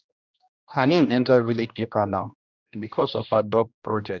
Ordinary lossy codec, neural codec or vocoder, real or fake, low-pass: none; codec, 16 kHz, 1 kbps, X-Codec, HuBERT features, trained on general audio; fake; 7.2 kHz